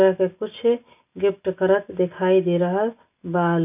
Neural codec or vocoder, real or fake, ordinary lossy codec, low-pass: none; real; none; 3.6 kHz